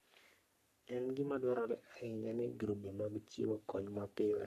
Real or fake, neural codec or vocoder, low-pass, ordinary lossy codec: fake; codec, 44.1 kHz, 3.4 kbps, Pupu-Codec; 14.4 kHz; none